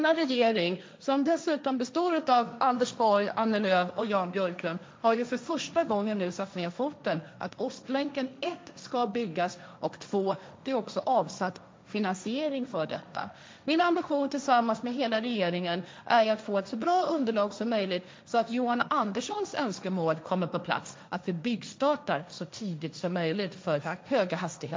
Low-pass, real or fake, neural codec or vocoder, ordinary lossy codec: none; fake; codec, 16 kHz, 1.1 kbps, Voila-Tokenizer; none